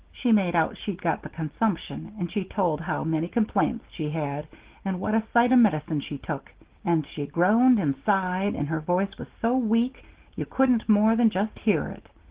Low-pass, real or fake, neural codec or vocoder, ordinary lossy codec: 3.6 kHz; real; none; Opus, 16 kbps